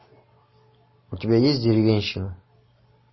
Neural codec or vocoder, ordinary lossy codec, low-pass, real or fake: none; MP3, 24 kbps; 7.2 kHz; real